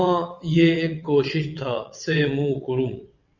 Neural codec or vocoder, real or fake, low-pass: vocoder, 22.05 kHz, 80 mel bands, WaveNeXt; fake; 7.2 kHz